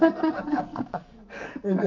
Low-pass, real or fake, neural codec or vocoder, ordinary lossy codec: 7.2 kHz; fake; codec, 44.1 kHz, 2.6 kbps, SNAC; MP3, 64 kbps